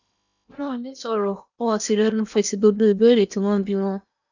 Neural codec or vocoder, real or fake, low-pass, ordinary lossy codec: codec, 16 kHz in and 24 kHz out, 0.8 kbps, FocalCodec, streaming, 65536 codes; fake; 7.2 kHz; none